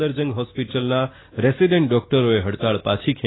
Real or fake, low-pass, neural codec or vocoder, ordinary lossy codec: real; 7.2 kHz; none; AAC, 16 kbps